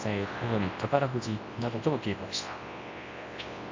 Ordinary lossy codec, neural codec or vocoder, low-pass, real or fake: MP3, 48 kbps; codec, 24 kHz, 0.9 kbps, WavTokenizer, large speech release; 7.2 kHz; fake